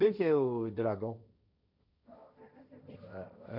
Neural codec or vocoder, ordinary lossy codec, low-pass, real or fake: codec, 16 kHz, 1.1 kbps, Voila-Tokenizer; none; 5.4 kHz; fake